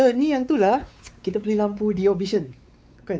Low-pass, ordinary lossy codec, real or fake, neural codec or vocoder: none; none; fake; codec, 16 kHz, 4 kbps, X-Codec, WavLM features, trained on Multilingual LibriSpeech